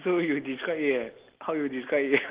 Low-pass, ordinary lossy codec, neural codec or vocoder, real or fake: 3.6 kHz; Opus, 16 kbps; none; real